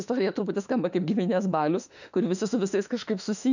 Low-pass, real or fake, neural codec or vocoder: 7.2 kHz; fake; autoencoder, 48 kHz, 32 numbers a frame, DAC-VAE, trained on Japanese speech